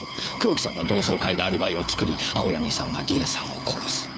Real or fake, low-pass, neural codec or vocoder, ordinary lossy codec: fake; none; codec, 16 kHz, 4 kbps, FunCodec, trained on LibriTTS, 50 frames a second; none